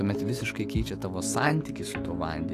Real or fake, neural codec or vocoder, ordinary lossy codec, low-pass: fake; autoencoder, 48 kHz, 128 numbers a frame, DAC-VAE, trained on Japanese speech; AAC, 48 kbps; 14.4 kHz